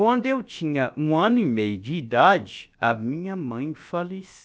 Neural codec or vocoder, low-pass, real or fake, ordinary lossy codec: codec, 16 kHz, about 1 kbps, DyCAST, with the encoder's durations; none; fake; none